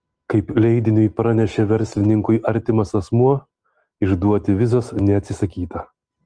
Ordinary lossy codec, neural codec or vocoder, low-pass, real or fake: Opus, 24 kbps; none; 9.9 kHz; real